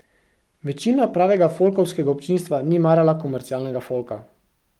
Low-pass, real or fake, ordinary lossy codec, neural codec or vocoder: 19.8 kHz; fake; Opus, 32 kbps; codec, 44.1 kHz, 7.8 kbps, Pupu-Codec